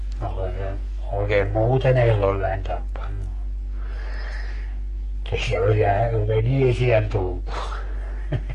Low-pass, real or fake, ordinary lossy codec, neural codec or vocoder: 14.4 kHz; fake; MP3, 48 kbps; codec, 44.1 kHz, 3.4 kbps, Pupu-Codec